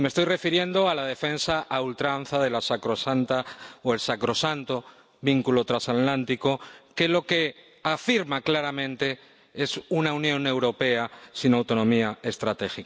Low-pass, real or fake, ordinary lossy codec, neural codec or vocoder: none; real; none; none